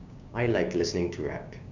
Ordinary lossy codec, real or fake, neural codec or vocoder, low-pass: none; fake; codec, 16 kHz, 6 kbps, DAC; 7.2 kHz